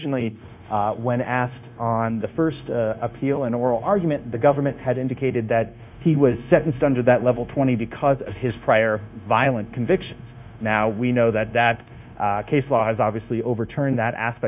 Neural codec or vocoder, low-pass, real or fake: codec, 16 kHz, 0.9 kbps, LongCat-Audio-Codec; 3.6 kHz; fake